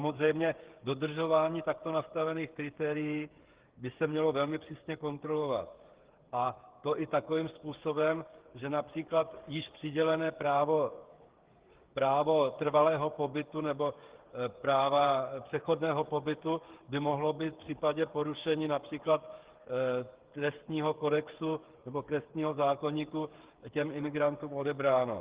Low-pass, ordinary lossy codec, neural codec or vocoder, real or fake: 3.6 kHz; Opus, 16 kbps; codec, 16 kHz, 8 kbps, FreqCodec, smaller model; fake